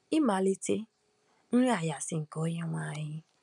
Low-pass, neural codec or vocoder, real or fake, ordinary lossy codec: 10.8 kHz; none; real; none